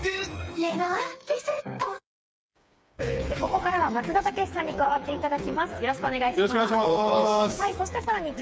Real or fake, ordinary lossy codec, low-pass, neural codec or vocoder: fake; none; none; codec, 16 kHz, 4 kbps, FreqCodec, smaller model